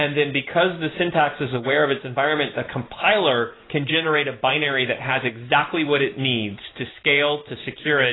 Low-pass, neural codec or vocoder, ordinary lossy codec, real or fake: 7.2 kHz; none; AAC, 16 kbps; real